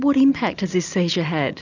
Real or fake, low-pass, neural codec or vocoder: real; 7.2 kHz; none